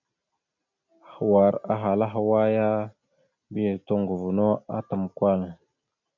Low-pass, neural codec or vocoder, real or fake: 7.2 kHz; none; real